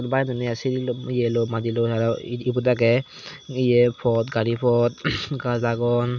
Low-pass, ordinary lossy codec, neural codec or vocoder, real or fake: 7.2 kHz; none; none; real